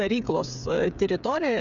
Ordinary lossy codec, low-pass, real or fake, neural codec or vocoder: MP3, 96 kbps; 7.2 kHz; fake; codec, 16 kHz, 8 kbps, FreqCodec, smaller model